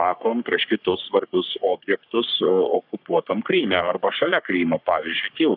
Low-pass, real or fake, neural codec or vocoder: 5.4 kHz; fake; codec, 44.1 kHz, 3.4 kbps, Pupu-Codec